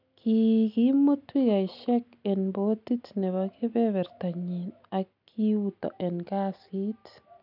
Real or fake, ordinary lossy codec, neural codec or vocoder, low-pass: real; none; none; 5.4 kHz